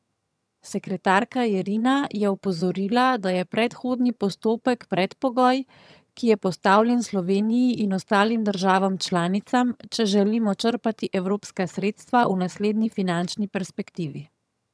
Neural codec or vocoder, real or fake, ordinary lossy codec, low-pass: vocoder, 22.05 kHz, 80 mel bands, HiFi-GAN; fake; none; none